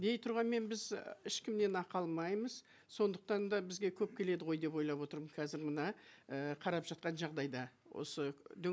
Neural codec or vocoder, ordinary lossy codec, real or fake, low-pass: none; none; real; none